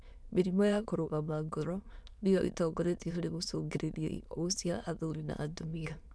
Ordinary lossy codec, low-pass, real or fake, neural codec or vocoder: none; none; fake; autoencoder, 22.05 kHz, a latent of 192 numbers a frame, VITS, trained on many speakers